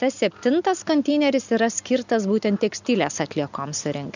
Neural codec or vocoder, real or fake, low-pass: none; real; 7.2 kHz